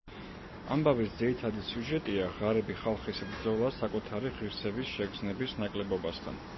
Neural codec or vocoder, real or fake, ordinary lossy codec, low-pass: none; real; MP3, 24 kbps; 7.2 kHz